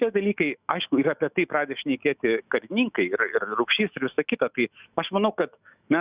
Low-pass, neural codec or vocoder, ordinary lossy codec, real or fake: 3.6 kHz; autoencoder, 48 kHz, 128 numbers a frame, DAC-VAE, trained on Japanese speech; Opus, 64 kbps; fake